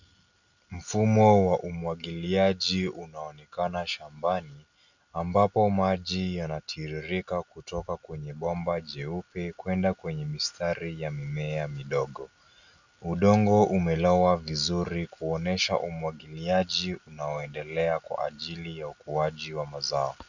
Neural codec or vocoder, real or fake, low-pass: none; real; 7.2 kHz